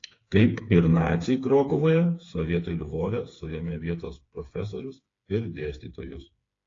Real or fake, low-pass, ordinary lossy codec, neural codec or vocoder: fake; 7.2 kHz; AAC, 32 kbps; codec, 16 kHz, 4 kbps, FreqCodec, smaller model